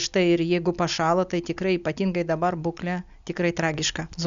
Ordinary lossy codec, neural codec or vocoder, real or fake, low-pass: AAC, 96 kbps; none; real; 7.2 kHz